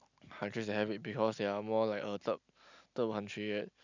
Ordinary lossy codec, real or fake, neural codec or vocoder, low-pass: none; real; none; 7.2 kHz